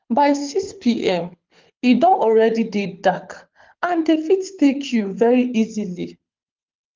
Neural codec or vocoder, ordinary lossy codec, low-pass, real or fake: codec, 24 kHz, 6 kbps, HILCodec; Opus, 32 kbps; 7.2 kHz; fake